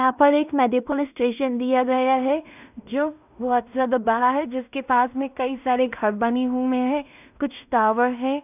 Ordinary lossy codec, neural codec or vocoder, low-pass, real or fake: none; codec, 16 kHz in and 24 kHz out, 0.4 kbps, LongCat-Audio-Codec, two codebook decoder; 3.6 kHz; fake